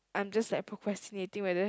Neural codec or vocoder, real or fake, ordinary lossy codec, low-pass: none; real; none; none